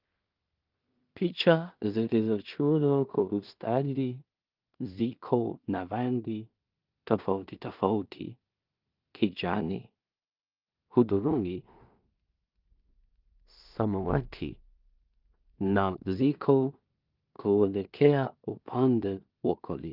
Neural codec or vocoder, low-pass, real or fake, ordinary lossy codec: codec, 16 kHz in and 24 kHz out, 0.4 kbps, LongCat-Audio-Codec, two codebook decoder; 5.4 kHz; fake; Opus, 32 kbps